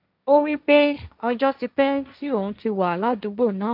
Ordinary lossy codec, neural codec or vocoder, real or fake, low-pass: none; codec, 16 kHz, 1.1 kbps, Voila-Tokenizer; fake; 5.4 kHz